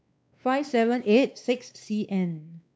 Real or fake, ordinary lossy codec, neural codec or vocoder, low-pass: fake; none; codec, 16 kHz, 2 kbps, X-Codec, WavLM features, trained on Multilingual LibriSpeech; none